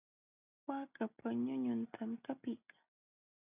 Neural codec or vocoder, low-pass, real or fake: none; 3.6 kHz; real